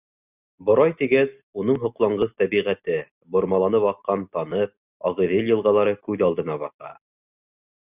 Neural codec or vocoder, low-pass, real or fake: none; 3.6 kHz; real